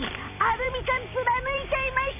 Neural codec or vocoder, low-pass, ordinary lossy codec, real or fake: vocoder, 44.1 kHz, 128 mel bands every 256 samples, BigVGAN v2; 3.6 kHz; AAC, 32 kbps; fake